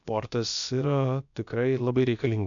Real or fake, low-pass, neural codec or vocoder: fake; 7.2 kHz; codec, 16 kHz, about 1 kbps, DyCAST, with the encoder's durations